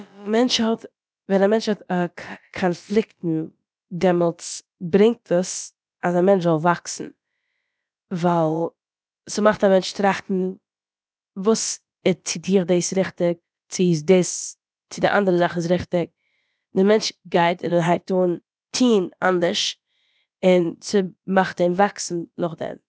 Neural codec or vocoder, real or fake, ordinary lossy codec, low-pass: codec, 16 kHz, about 1 kbps, DyCAST, with the encoder's durations; fake; none; none